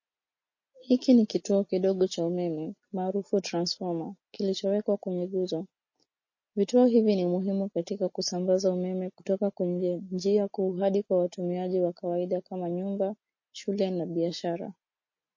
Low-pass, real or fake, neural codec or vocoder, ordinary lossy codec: 7.2 kHz; real; none; MP3, 32 kbps